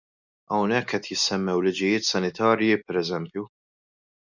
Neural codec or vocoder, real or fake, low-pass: none; real; 7.2 kHz